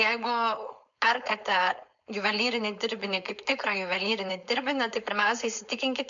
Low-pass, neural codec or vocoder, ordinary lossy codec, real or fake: 7.2 kHz; codec, 16 kHz, 4.8 kbps, FACodec; MP3, 64 kbps; fake